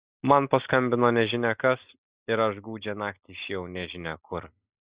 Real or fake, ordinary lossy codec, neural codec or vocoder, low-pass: real; Opus, 64 kbps; none; 3.6 kHz